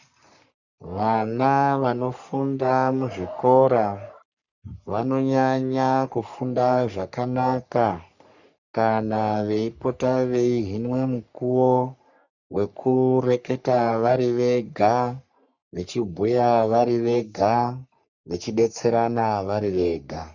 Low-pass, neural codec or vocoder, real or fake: 7.2 kHz; codec, 44.1 kHz, 3.4 kbps, Pupu-Codec; fake